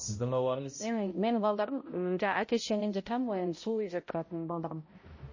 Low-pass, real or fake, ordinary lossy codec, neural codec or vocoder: 7.2 kHz; fake; MP3, 32 kbps; codec, 16 kHz, 0.5 kbps, X-Codec, HuBERT features, trained on balanced general audio